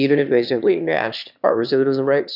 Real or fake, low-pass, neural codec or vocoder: fake; 5.4 kHz; autoencoder, 22.05 kHz, a latent of 192 numbers a frame, VITS, trained on one speaker